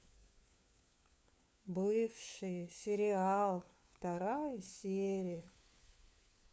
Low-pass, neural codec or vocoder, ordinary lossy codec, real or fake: none; codec, 16 kHz, 4 kbps, FunCodec, trained on LibriTTS, 50 frames a second; none; fake